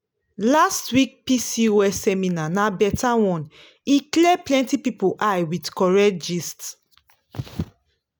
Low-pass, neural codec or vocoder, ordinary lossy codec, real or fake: none; none; none; real